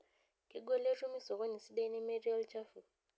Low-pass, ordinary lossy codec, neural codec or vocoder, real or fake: none; none; none; real